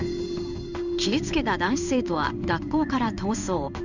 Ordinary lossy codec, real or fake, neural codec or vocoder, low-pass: none; fake; codec, 16 kHz in and 24 kHz out, 1 kbps, XY-Tokenizer; 7.2 kHz